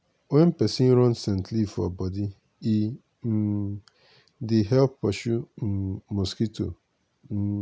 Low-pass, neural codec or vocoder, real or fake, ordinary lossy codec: none; none; real; none